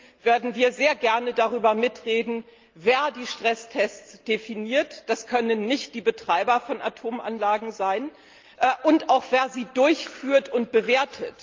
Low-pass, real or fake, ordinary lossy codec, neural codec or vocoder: 7.2 kHz; real; Opus, 24 kbps; none